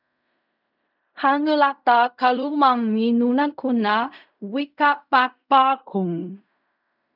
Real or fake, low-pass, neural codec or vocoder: fake; 5.4 kHz; codec, 16 kHz in and 24 kHz out, 0.4 kbps, LongCat-Audio-Codec, fine tuned four codebook decoder